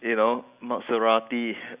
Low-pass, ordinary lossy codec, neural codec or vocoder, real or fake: 3.6 kHz; Opus, 64 kbps; none; real